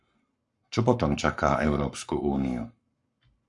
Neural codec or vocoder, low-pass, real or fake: codec, 44.1 kHz, 7.8 kbps, Pupu-Codec; 10.8 kHz; fake